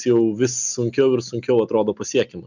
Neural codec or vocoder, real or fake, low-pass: none; real; 7.2 kHz